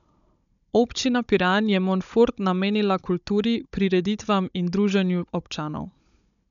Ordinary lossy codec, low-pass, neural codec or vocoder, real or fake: none; 7.2 kHz; codec, 16 kHz, 16 kbps, FunCodec, trained on Chinese and English, 50 frames a second; fake